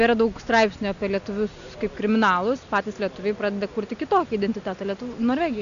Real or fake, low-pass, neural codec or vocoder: real; 7.2 kHz; none